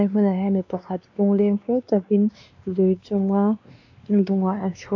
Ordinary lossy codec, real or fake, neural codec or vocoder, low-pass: AAC, 48 kbps; fake; codec, 16 kHz, 2 kbps, X-Codec, WavLM features, trained on Multilingual LibriSpeech; 7.2 kHz